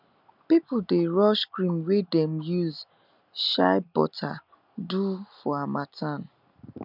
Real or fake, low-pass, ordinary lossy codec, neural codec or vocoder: real; 5.4 kHz; none; none